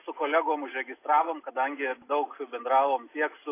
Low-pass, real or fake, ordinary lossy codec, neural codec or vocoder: 3.6 kHz; real; AAC, 24 kbps; none